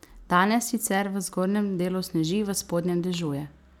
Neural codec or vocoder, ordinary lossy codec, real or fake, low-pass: none; none; real; 19.8 kHz